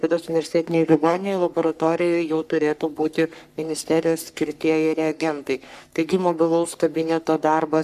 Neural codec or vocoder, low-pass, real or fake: codec, 44.1 kHz, 3.4 kbps, Pupu-Codec; 14.4 kHz; fake